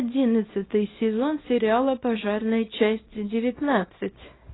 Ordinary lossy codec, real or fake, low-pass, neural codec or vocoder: AAC, 16 kbps; fake; 7.2 kHz; codec, 24 kHz, 0.9 kbps, WavTokenizer, small release